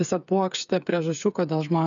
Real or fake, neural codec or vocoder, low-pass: fake; codec, 16 kHz, 8 kbps, FreqCodec, smaller model; 7.2 kHz